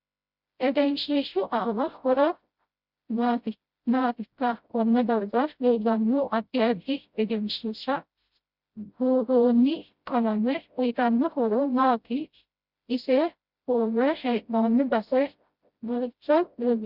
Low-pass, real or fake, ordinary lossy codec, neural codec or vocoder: 5.4 kHz; fake; Opus, 64 kbps; codec, 16 kHz, 0.5 kbps, FreqCodec, smaller model